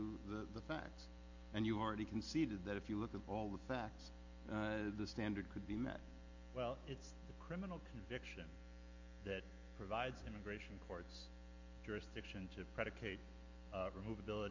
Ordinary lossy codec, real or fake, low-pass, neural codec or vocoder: MP3, 48 kbps; real; 7.2 kHz; none